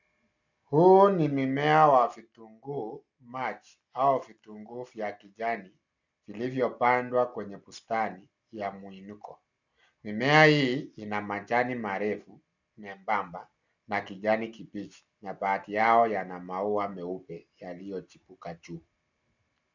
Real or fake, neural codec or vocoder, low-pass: real; none; 7.2 kHz